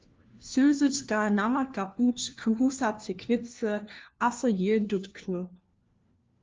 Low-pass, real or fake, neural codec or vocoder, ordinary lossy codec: 7.2 kHz; fake; codec, 16 kHz, 1 kbps, FunCodec, trained on LibriTTS, 50 frames a second; Opus, 24 kbps